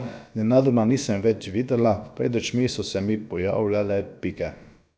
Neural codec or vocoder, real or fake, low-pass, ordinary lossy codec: codec, 16 kHz, about 1 kbps, DyCAST, with the encoder's durations; fake; none; none